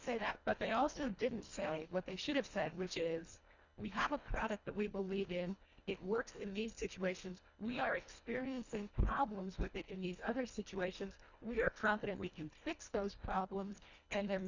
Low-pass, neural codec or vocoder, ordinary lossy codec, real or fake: 7.2 kHz; codec, 24 kHz, 1.5 kbps, HILCodec; Opus, 64 kbps; fake